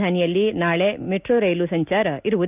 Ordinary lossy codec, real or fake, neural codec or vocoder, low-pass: none; real; none; 3.6 kHz